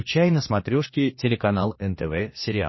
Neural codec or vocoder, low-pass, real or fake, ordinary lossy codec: codec, 16 kHz, 2 kbps, X-Codec, HuBERT features, trained on general audio; 7.2 kHz; fake; MP3, 24 kbps